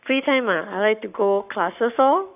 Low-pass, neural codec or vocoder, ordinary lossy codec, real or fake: 3.6 kHz; codec, 44.1 kHz, 7.8 kbps, Pupu-Codec; none; fake